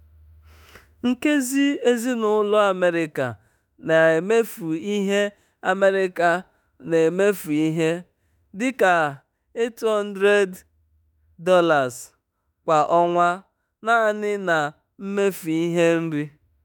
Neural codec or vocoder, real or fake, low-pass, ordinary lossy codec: autoencoder, 48 kHz, 32 numbers a frame, DAC-VAE, trained on Japanese speech; fake; none; none